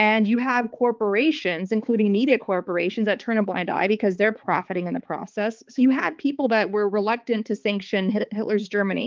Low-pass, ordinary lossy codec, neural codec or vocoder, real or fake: 7.2 kHz; Opus, 32 kbps; codec, 16 kHz, 4 kbps, X-Codec, HuBERT features, trained on balanced general audio; fake